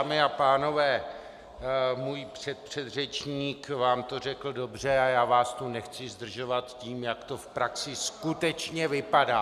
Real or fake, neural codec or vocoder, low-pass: real; none; 14.4 kHz